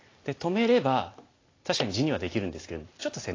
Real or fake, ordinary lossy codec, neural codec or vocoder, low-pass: real; AAC, 32 kbps; none; 7.2 kHz